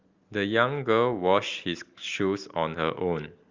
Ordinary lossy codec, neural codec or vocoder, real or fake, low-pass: Opus, 32 kbps; none; real; 7.2 kHz